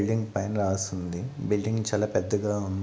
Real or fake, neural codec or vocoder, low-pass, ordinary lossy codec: real; none; none; none